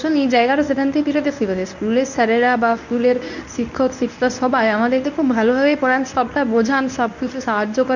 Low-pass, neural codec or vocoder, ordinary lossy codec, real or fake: 7.2 kHz; codec, 24 kHz, 0.9 kbps, WavTokenizer, medium speech release version 1; none; fake